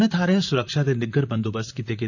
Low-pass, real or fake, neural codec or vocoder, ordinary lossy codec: 7.2 kHz; fake; vocoder, 44.1 kHz, 128 mel bands, Pupu-Vocoder; none